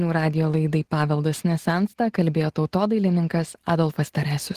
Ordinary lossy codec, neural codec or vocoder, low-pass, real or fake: Opus, 16 kbps; none; 14.4 kHz; real